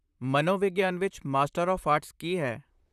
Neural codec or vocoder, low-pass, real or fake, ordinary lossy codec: vocoder, 44.1 kHz, 128 mel bands, Pupu-Vocoder; 14.4 kHz; fake; none